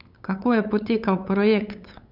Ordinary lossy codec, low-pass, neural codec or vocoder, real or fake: none; 5.4 kHz; codec, 16 kHz, 8 kbps, FunCodec, trained on LibriTTS, 25 frames a second; fake